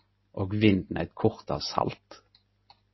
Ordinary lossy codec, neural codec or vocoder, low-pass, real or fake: MP3, 24 kbps; none; 7.2 kHz; real